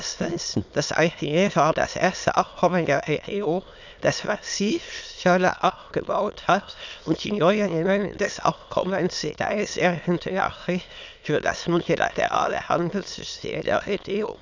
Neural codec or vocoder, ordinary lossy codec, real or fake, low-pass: autoencoder, 22.05 kHz, a latent of 192 numbers a frame, VITS, trained on many speakers; none; fake; 7.2 kHz